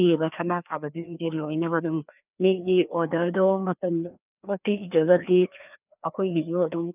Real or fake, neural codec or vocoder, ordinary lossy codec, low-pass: fake; codec, 16 kHz, 2 kbps, FreqCodec, larger model; none; 3.6 kHz